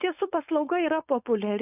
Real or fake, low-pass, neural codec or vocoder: fake; 3.6 kHz; codec, 16 kHz, 4.8 kbps, FACodec